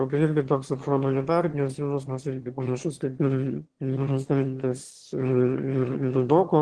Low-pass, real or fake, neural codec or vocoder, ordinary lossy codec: 9.9 kHz; fake; autoencoder, 22.05 kHz, a latent of 192 numbers a frame, VITS, trained on one speaker; Opus, 16 kbps